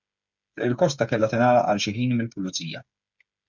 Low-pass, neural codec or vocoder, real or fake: 7.2 kHz; codec, 16 kHz, 8 kbps, FreqCodec, smaller model; fake